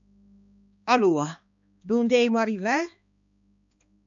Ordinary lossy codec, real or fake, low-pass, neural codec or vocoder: AAC, 64 kbps; fake; 7.2 kHz; codec, 16 kHz, 2 kbps, X-Codec, HuBERT features, trained on balanced general audio